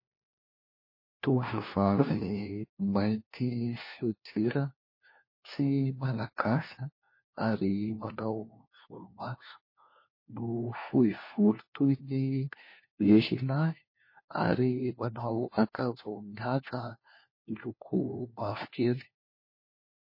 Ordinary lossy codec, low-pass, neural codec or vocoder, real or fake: MP3, 24 kbps; 5.4 kHz; codec, 16 kHz, 1 kbps, FunCodec, trained on LibriTTS, 50 frames a second; fake